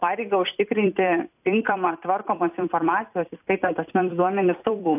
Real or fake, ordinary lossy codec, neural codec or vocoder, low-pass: fake; AAC, 32 kbps; vocoder, 44.1 kHz, 128 mel bands every 256 samples, BigVGAN v2; 3.6 kHz